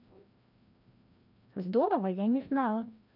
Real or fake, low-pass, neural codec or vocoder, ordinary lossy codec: fake; 5.4 kHz; codec, 16 kHz, 1 kbps, FreqCodec, larger model; none